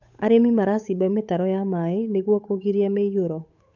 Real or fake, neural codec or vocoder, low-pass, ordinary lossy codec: fake; codec, 16 kHz, 8 kbps, FunCodec, trained on Chinese and English, 25 frames a second; 7.2 kHz; none